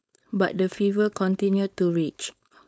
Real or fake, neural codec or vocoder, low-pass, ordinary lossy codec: fake; codec, 16 kHz, 4.8 kbps, FACodec; none; none